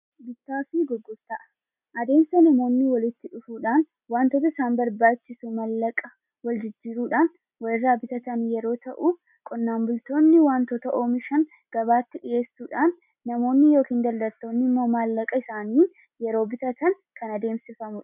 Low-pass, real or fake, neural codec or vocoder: 3.6 kHz; real; none